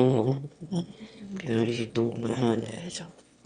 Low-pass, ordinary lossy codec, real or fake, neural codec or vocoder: 9.9 kHz; Opus, 64 kbps; fake; autoencoder, 22.05 kHz, a latent of 192 numbers a frame, VITS, trained on one speaker